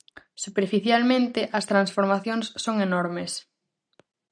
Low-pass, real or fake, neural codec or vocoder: 9.9 kHz; real; none